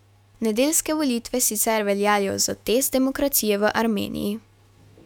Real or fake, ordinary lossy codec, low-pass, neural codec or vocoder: fake; none; 19.8 kHz; autoencoder, 48 kHz, 128 numbers a frame, DAC-VAE, trained on Japanese speech